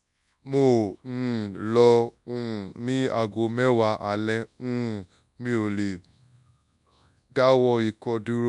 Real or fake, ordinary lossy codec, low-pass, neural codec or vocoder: fake; none; 10.8 kHz; codec, 24 kHz, 0.9 kbps, WavTokenizer, large speech release